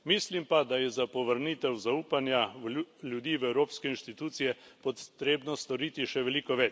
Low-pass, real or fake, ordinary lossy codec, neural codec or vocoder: none; real; none; none